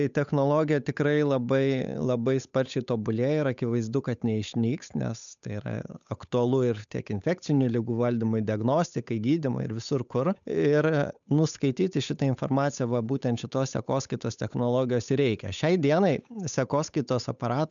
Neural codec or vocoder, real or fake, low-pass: codec, 16 kHz, 8 kbps, FunCodec, trained on Chinese and English, 25 frames a second; fake; 7.2 kHz